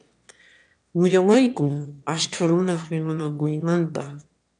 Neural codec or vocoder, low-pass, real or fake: autoencoder, 22.05 kHz, a latent of 192 numbers a frame, VITS, trained on one speaker; 9.9 kHz; fake